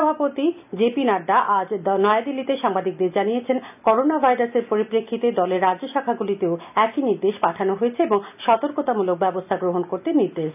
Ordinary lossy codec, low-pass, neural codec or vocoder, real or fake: none; 3.6 kHz; none; real